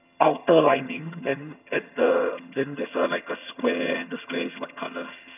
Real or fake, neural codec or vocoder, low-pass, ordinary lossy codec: fake; vocoder, 22.05 kHz, 80 mel bands, HiFi-GAN; 3.6 kHz; none